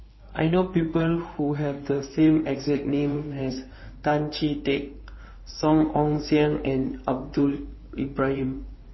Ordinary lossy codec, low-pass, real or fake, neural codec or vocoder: MP3, 24 kbps; 7.2 kHz; fake; codec, 16 kHz in and 24 kHz out, 2.2 kbps, FireRedTTS-2 codec